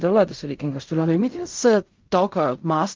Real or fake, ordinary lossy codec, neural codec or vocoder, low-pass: fake; Opus, 24 kbps; codec, 16 kHz in and 24 kHz out, 0.4 kbps, LongCat-Audio-Codec, fine tuned four codebook decoder; 7.2 kHz